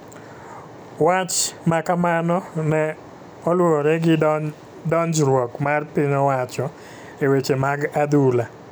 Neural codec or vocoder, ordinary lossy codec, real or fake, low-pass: none; none; real; none